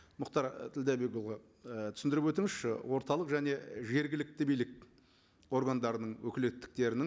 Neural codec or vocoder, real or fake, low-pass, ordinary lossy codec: none; real; none; none